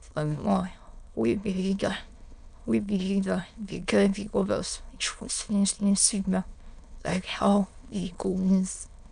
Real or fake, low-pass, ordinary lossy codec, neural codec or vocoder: fake; 9.9 kHz; none; autoencoder, 22.05 kHz, a latent of 192 numbers a frame, VITS, trained on many speakers